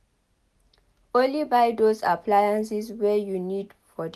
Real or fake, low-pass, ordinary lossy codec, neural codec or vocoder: real; 14.4 kHz; none; none